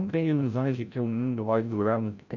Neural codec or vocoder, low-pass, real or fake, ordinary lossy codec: codec, 16 kHz, 0.5 kbps, FreqCodec, larger model; 7.2 kHz; fake; none